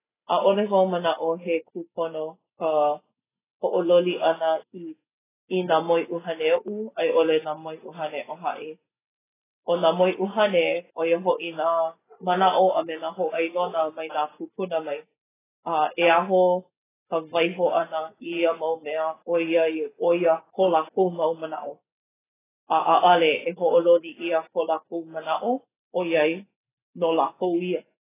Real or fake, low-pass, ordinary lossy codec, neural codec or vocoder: real; 3.6 kHz; AAC, 16 kbps; none